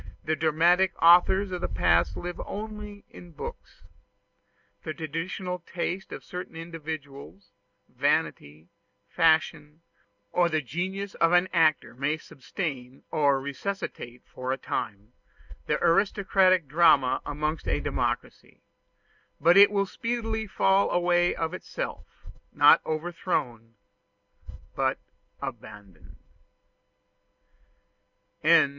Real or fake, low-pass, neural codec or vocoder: real; 7.2 kHz; none